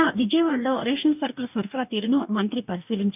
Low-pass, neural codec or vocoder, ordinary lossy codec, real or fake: 3.6 kHz; codec, 44.1 kHz, 2.6 kbps, DAC; none; fake